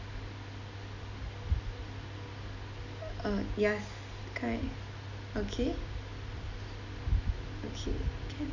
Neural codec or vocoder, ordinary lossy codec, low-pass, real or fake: none; none; 7.2 kHz; real